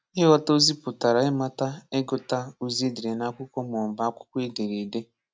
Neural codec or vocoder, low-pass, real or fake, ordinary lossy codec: none; none; real; none